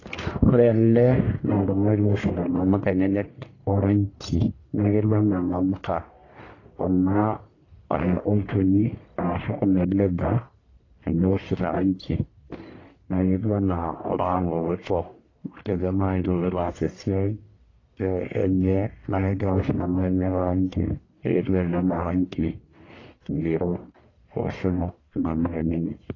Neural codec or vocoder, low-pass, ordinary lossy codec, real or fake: codec, 44.1 kHz, 1.7 kbps, Pupu-Codec; 7.2 kHz; AAC, 32 kbps; fake